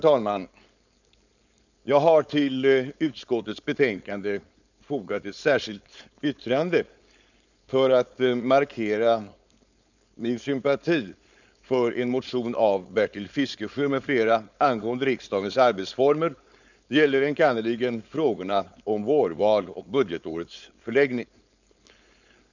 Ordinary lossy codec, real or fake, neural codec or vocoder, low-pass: none; fake; codec, 16 kHz, 4.8 kbps, FACodec; 7.2 kHz